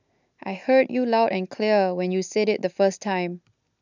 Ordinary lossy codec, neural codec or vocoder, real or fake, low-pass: none; none; real; 7.2 kHz